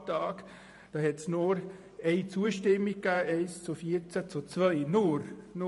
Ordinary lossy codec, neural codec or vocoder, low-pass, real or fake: MP3, 48 kbps; vocoder, 44.1 kHz, 128 mel bands every 512 samples, BigVGAN v2; 14.4 kHz; fake